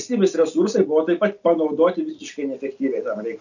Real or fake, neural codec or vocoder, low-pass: real; none; 7.2 kHz